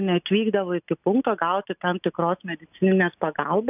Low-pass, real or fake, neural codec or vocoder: 3.6 kHz; real; none